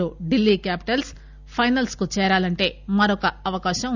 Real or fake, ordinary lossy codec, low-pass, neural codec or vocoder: real; none; 7.2 kHz; none